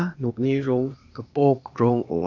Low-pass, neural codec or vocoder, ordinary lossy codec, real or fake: 7.2 kHz; codec, 16 kHz, 0.8 kbps, ZipCodec; none; fake